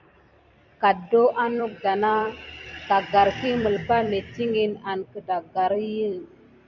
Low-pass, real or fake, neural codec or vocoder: 7.2 kHz; fake; vocoder, 44.1 kHz, 128 mel bands every 256 samples, BigVGAN v2